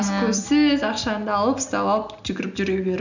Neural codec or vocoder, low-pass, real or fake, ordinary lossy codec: none; 7.2 kHz; real; none